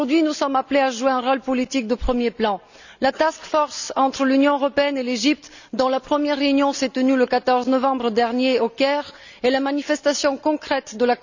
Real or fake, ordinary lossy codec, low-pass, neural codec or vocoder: real; none; 7.2 kHz; none